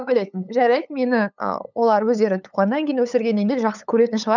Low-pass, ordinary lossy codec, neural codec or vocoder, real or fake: 7.2 kHz; none; codec, 16 kHz, 8 kbps, FunCodec, trained on LibriTTS, 25 frames a second; fake